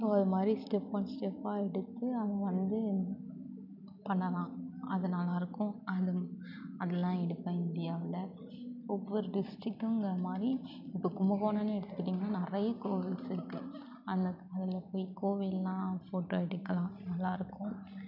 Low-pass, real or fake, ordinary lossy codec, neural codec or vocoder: 5.4 kHz; real; none; none